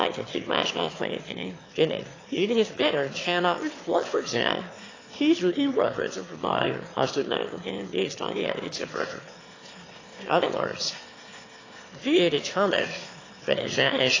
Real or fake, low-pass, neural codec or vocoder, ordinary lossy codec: fake; 7.2 kHz; autoencoder, 22.05 kHz, a latent of 192 numbers a frame, VITS, trained on one speaker; AAC, 32 kbps